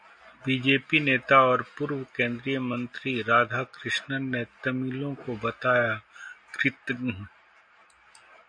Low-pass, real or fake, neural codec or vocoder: 9.9 kHz; real; none